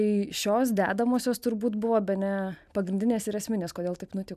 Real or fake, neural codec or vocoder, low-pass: real; none; 14.4 kHz